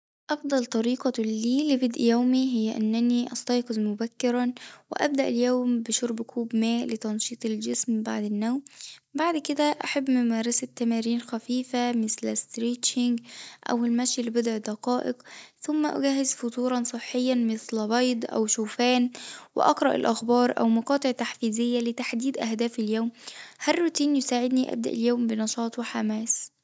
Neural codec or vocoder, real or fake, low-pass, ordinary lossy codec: none; real; none; none